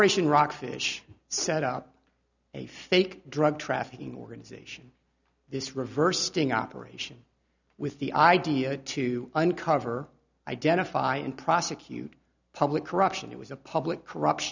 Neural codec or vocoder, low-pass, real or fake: none; 7.2 kHz; real